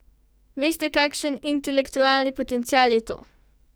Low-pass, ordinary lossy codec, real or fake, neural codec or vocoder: none; none; fake; codec, 44.1 kHz, 2.6 kbps, SNAC